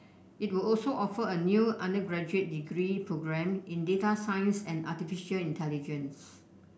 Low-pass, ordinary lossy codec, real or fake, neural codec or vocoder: none; none; real; none